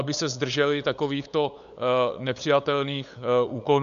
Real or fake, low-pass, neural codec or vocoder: fake; 7.2 kHz; codec, 16 kHz, 8 kbps, FunCodec, trained on LibriTTS, 25 frames a second